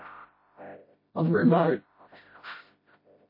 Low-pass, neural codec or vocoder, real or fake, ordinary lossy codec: 5.4 kHz; codec, 16 kHz, 0.5 kbps, FreqCodec, smaller model; fake; MP3, 24 kbps